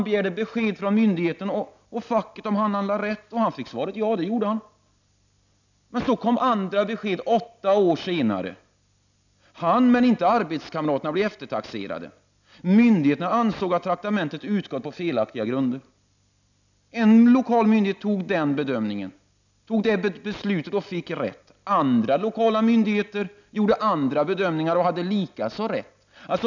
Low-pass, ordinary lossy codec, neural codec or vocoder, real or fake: 7.2 kHz; none; none; real